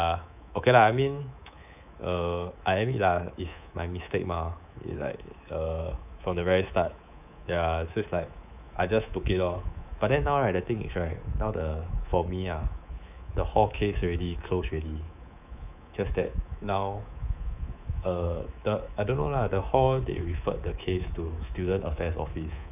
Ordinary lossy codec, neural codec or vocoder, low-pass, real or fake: none; codec, 24 kHz, 3.1 kbps, DualCodec; 3.6 kHz; fake